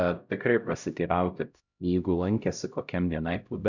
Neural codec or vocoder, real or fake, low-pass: codec, 16 kHz, 0.5 kbps, X-Codec, HuBERT features, trained on LibriSpeech; fake; 7.2 kHz